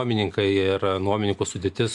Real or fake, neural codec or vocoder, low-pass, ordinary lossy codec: real; none; 10.8 kHz; MP3, 64 kbps